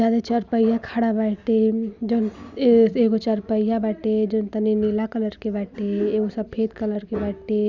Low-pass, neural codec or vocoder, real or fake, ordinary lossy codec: 7.2 kHz; none; real; none